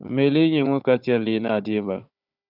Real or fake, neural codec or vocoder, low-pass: fake; codec, 16 kHz, 4 kbps, FunCodec, trained on Chinese and English, 50 frames a second; 5.4 kHz